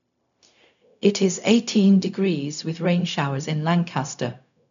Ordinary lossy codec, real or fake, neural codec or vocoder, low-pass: none; fake; codec, 16 kHz, 0.4 kbps, LongCat-Audio-Codec; 7.2 kHz